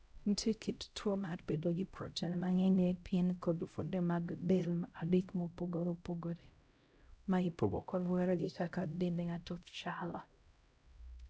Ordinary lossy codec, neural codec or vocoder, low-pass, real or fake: none; codec, 16 kHz, 0.5 kbps, X-Codec, HuBERT features, trained on LibriSpeech; none; fake